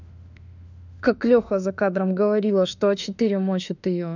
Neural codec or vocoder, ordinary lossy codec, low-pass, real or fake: autoencoder, 48 kHz, 32 numbers a frame, DAC-VAE, trained on Japanese speech; none; 7.2 kHz; fake